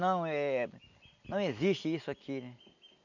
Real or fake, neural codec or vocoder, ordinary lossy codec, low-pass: real; none; none; 7.2 kHz